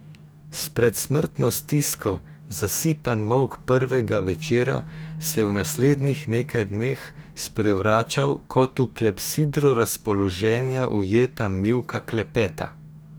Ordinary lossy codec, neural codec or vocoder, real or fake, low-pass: none; codec, 44.1 kHz, 2.6 kbps, DAC; fake; none